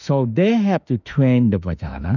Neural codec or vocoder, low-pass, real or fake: autoencoder, 48 kHz, 32 numbers a frame, DAC-VAE, trained on Japanese speech; 7.2 kHz; fake